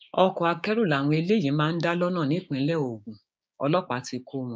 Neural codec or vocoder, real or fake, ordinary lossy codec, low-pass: codec, 16 kHz, 6 kbps, DAC; fake; none; none